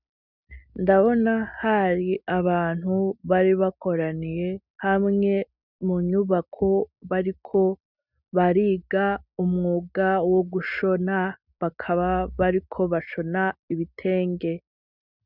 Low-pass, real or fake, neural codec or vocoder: 5.4 kHz; real; none